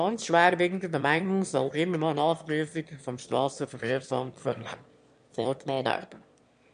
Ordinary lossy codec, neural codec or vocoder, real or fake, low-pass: MP3, 64 kbps; autoencoder, 22.05 kHz, a latent of 192 numbers a frame, VITS, trained on one speaker; fake; 9.9 kHz